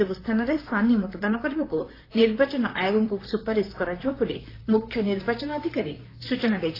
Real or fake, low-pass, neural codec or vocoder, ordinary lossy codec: fake; 5.4 kHz; vocoder, 44.1 kHz, 128 mel bands, Pupu-Vocoder; AAC, 24 kbps